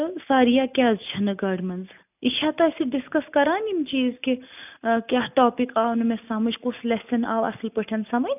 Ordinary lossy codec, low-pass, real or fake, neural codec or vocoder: none; 3.6 kHz; real; none